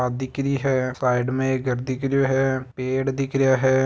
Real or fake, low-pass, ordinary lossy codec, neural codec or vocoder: real; none; none; none